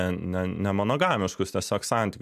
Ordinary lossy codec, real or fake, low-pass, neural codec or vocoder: MP3, 96 kbps; real; 14.4 kHz; none